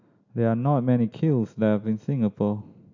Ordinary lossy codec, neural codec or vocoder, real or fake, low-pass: AAC, 48 kbps; none; real; 7.2 kHz